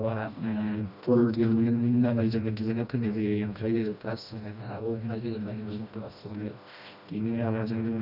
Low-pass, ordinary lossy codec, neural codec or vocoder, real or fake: 5.4 kHz; none; codec, 16 kHz, 1 kbps, FreqCodec, smaller model; fake